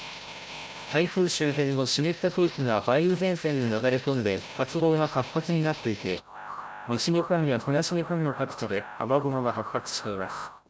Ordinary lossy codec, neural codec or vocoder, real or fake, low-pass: none; codec, 16 kHz, 0.5 kbps, FreqCodec, larger model; fake; none